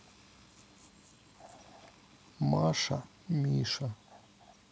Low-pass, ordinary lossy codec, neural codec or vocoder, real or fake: none; none; none; real